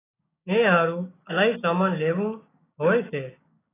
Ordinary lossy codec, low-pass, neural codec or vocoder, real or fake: AAC, 16 kbps; 3.6 kHz; codec, 16 kHz, 6 kbps, DAC; fake